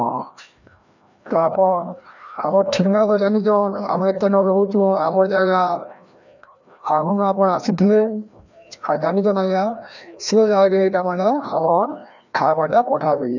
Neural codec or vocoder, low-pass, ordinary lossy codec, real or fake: codec, 16 kHz, 1 kbps, FreqCodec, larger model; 7.2 kHz; none; fake